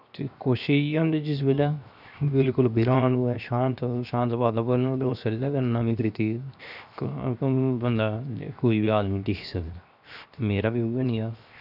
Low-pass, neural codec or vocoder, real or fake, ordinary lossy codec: 5.4 kHz; codec, 16 kHz, 0.7 kbps, FocalCodec; fake; none